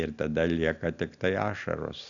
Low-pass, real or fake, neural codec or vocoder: 7.2 kHz; real; none